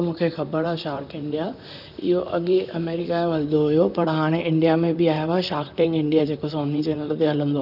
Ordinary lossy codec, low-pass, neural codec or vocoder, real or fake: none; 5.4 kHz; vocoder, 44.1 kHz, 128 mel bands, Pupu-Vocoder; fake